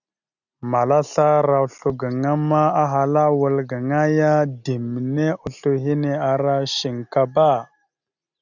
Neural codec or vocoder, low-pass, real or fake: none; 7.2 kHz; real